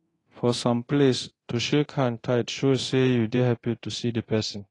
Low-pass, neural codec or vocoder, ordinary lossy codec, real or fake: 10.8 kHz; codec, 24 kHz, 0.9 kbps, DualCodec; AAC, 32 kbps; fake